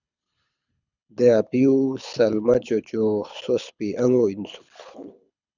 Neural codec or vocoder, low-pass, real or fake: codec, 24 kHz, 6 kbps, HILCodec; 7.2 kHz; fake